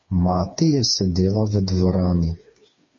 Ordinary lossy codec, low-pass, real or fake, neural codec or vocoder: MP3, 32 kbps; 7.2 kHz; fake; codec, 16 kHz, 4 kbps, FreqCodec, smaller model